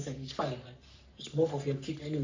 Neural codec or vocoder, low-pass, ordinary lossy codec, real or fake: codec, 44.1 kHz, 3.4 kbps, Pupu-Codec; 7.2 kHz; AAC, 48 kbps; fake